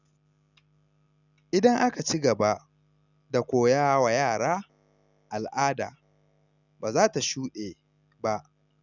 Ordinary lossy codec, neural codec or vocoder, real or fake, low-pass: none; none; real; 7.2 kHz